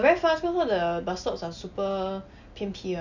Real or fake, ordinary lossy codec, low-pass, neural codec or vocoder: real; none; 7.2 kHz; none